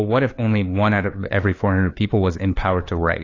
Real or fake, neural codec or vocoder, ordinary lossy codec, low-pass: fake; codec, 16 kHz, 2 kbps, FunCodec, trained on LibriTTS, 25 frames a second; AAC, 32 kbps; 7.2 kHz